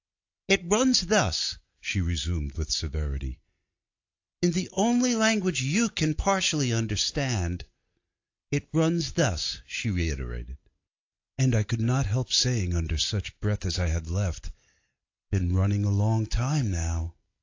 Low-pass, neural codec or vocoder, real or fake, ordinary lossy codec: 7.2 kHz; none; real; AAC, 48 kbps